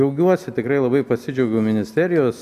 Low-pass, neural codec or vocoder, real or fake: 14.4 kHz; none; real